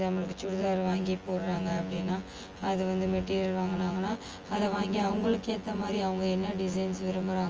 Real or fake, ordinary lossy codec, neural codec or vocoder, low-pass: fake; Opus, 24 kbps; vocoder, 24 kHz, 100 mel bands, Vocos; 7.2 kHz